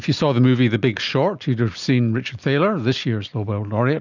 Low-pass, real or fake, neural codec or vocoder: 7.2 kHz; real; none